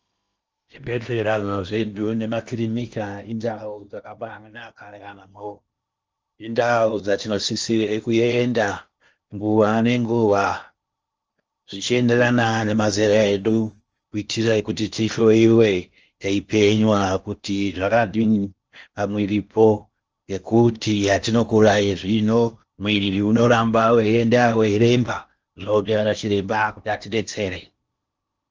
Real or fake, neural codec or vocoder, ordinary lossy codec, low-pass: fake; codec, 16 kHz in and 24 kHz out, 0.8 kbps, FocalCodec, streaming, 65536 codes; Opus, 24 kbps; 7.2 kHz